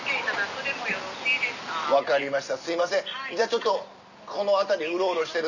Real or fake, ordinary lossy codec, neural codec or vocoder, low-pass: real; none; none; 7.2 kHz